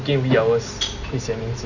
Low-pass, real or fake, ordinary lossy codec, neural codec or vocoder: 7.2 kHz; real; none; none